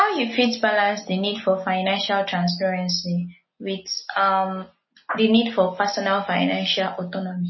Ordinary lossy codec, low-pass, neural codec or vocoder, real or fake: MP3, 24 kbps; 7.2 kHz; none; real